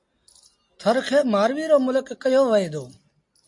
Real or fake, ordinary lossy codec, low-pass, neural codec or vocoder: real; MP3, 48 kbps; 10.8 kHz; none